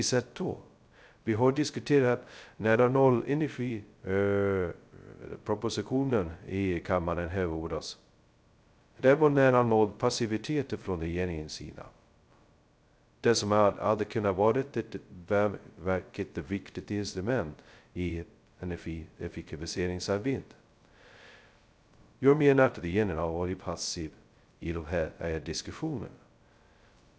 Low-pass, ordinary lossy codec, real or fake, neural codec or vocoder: none; none; fake; codec, 16 kHz, 0.2 kbps, FocalCodec